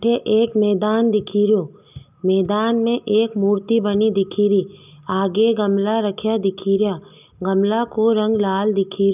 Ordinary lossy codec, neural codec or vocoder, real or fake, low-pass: none; none; real; 3.6 kHz